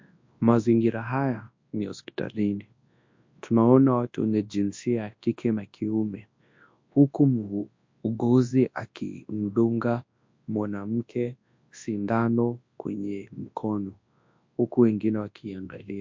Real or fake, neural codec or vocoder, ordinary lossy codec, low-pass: fake; codec, 24 kHz, 0.9 kbps, WavTokenizer, large speech release; MP3, 48 kbps; 7.2 kHz